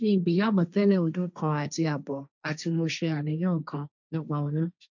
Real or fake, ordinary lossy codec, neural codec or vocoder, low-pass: fake; none; codec, 16 kHz, 1.1 kbps, Voila-Tokenizer; 7.2 kHz